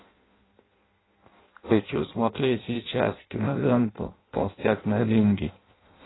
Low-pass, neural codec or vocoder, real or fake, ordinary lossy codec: 7.2 kHz; codec, 16 kHz in and 24 kHz out, 0.6 kbps, FireRedTTS-2 codec; fake; AAC, 16 kbps